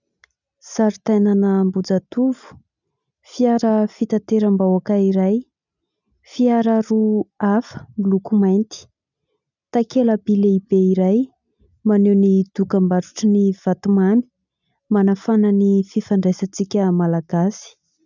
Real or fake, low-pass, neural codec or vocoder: real; 7.2 kHz; none